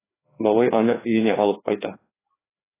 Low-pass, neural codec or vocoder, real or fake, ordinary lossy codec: 3.6 kHz; codec, 16 kHz, 2 kbps, FreqCodec, larger model; fake; AAC, 16 kbps